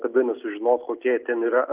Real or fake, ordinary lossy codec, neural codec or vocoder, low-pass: real; Opus, 32 kbps; none; 3.6 kHz